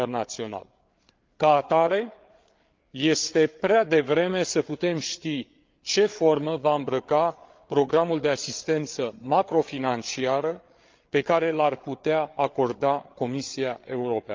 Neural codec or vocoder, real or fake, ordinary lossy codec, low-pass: codec, 16 kHz, 8 kbps, FreqCodec, larger model; fake; Opus, 32 kbps; 7.2 kHz